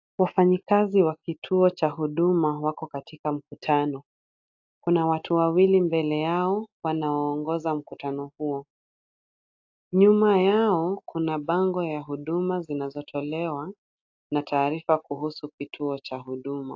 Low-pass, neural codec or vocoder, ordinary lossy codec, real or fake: 7.2 kHz; none; AAC, 48 kbps; real